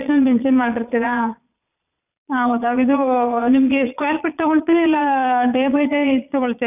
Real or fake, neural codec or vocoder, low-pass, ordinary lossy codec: fake; vocoder, 22.05 kHz, 80 mel bands, Vocos; 3.6 kHz; none